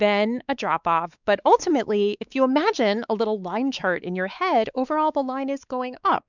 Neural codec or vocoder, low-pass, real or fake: codec, 16 kHz, 4 kbps, X-Codec, WavLM features, trained on Multilingual LibriSpeech; 7.2 kHz; fake